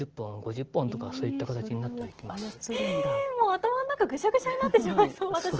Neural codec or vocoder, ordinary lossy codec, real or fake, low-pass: none; Opus, 16 kbps; real; 7.2 kHz